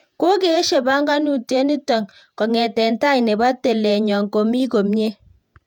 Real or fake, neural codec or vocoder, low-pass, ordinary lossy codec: fake; vocoder, 48 kHz, 128 mel bands, Vocos; 19.8 kHz; none